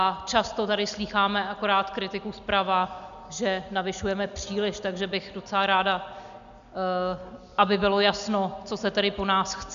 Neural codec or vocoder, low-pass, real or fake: none; 7.2 kHz; real